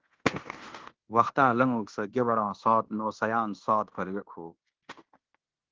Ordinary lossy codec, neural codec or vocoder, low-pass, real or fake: Opus, 16 kbps; codec, 16 kHz in and 24 kHz out, 0.9 kbps, LongCat-Audio-Codec, fine tuned four codebook decoder; 7.2 kHz; fake